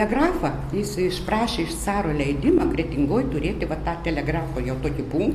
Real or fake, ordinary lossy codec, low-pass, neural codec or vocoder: real; AAC, 64 kbps; 14.4 kHz; none